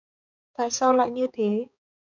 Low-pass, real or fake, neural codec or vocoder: 7.2 kHz; fake; codec, 16 kHz, 4 kbps, X-Codec, HuBERT features, trained on balanced general audio